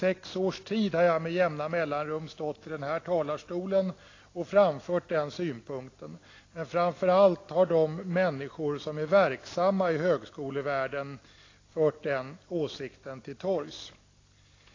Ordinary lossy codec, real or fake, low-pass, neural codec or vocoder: AAC, 32 kbps; real; 7.2 kHz; none